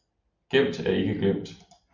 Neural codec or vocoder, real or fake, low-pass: vocoder, 44.1 kHz, 128 mel bands every 256 samples, BigVGAN v2; fake; 7.2 kHz